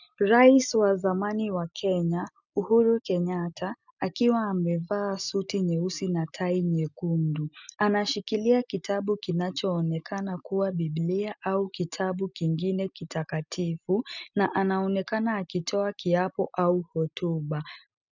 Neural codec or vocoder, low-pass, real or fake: none; 7.2 kHz; real